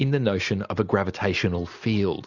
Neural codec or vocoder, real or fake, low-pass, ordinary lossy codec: none; real; 7.2 kHz; Opus, 64 kbps